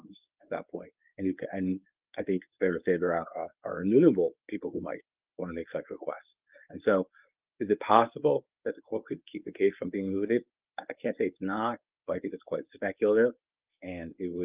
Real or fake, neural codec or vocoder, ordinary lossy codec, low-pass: fake; codec, 24 kHz, 0.9 kbps, WavTokenizer, medium speech release version 1; Opus, 32 kbps; 3.6 kHz